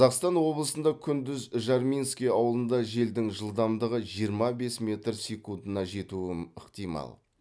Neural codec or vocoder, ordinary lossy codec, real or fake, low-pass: none; none; real; none